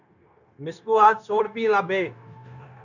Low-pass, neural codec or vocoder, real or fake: 7.2 kHz; codec, 16 kHz, 0.9 kbps, LongCat-Audio-Codec; fake